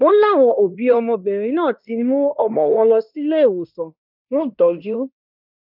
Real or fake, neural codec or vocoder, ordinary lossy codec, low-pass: fake; codec, 16 kHz in and 24 kHz out, 0.9 kbps, LongCat-Audio-Codec, fine tuned four codebook decoder; none; 5.4 kHz